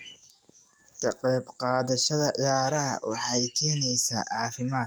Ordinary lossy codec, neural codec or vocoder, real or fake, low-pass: none; codec, 44.1 kHz, 7.8 kbps, DAC; fake; none